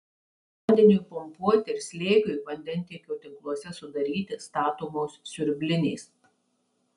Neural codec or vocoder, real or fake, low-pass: none; real; 10.8 kHz